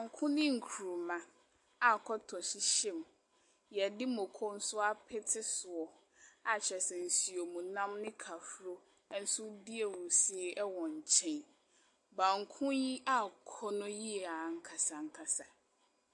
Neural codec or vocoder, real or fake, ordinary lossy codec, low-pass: none; real; AAC, 64 kbps; 10.8 kHz